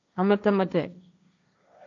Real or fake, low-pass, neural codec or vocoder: fake; 7.2 kHz; codec, 16 kHz, 1.1 kbps, Voila-Tokenizer